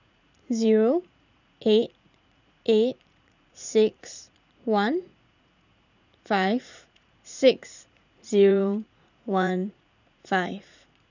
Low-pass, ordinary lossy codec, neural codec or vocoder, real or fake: 7.2 kHz; none; vocoder, 44.1 kHz, 80 mel bands, Vocos; fake